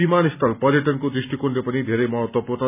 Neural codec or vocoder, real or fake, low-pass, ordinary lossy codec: none; real; 3.6 kHz; none